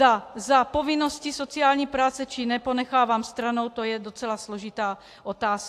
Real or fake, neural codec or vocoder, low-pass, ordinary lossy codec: real; none; 14.4 kHz; AAC, 64 kbps